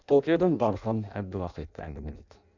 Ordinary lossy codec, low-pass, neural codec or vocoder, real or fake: none; 7.2 kHz; codec, 16 kHz in and 24 kHz out, 0.6 kbps, FireRedTTS-2 codec; fake